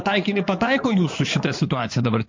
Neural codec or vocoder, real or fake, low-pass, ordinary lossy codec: codec, 16 kHz in and 24 kHz out, 2.2 kbps, FireRedTTS-2 codec; fake; 7.2 kHz; MP3, 48 kbps